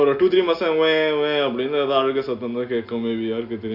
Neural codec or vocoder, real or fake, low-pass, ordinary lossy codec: none; real; 5.4 kHz; none